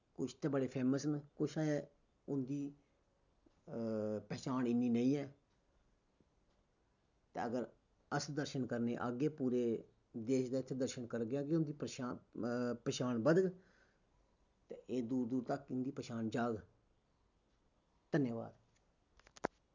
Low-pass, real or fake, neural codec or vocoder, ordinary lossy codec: 7.2 kHz; real; none; none